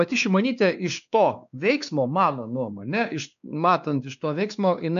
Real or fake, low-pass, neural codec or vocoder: fake; 7.2 kHz; codec, 16 kHz, 2 kbps, X-Codec, WavLM features, trained on Multilingual LibriSpeech